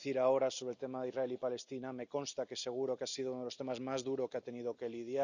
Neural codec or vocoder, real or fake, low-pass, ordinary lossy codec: none; real; 7.2 kHz; none